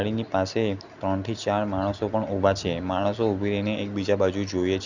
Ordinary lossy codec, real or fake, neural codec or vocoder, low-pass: none; real; none; 7.2 kHz